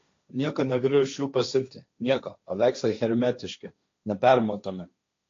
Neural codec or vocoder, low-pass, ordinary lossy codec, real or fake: codec, 16 kHz, 1.1 kbps, Voila-Tokenizer; 7.2 kHz; AAC, 48 kbps; fake